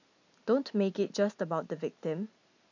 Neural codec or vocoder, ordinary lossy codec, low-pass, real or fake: none; AAC, 48 kbps; 7.2 kHz; real